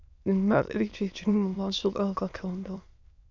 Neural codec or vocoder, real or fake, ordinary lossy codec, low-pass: autoencoder, 22.05 kHz, a latent of 192 numbers a frame, VITS, trained on many speakers; fake; MP3, 64 kbps; 7.2 kHz